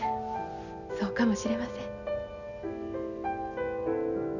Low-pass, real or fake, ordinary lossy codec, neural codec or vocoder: 7.2 kHz; real; none; none